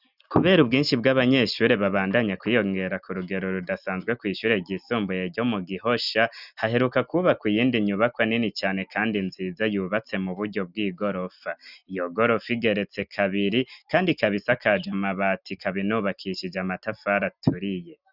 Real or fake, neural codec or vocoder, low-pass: real; none; 5.4 kHz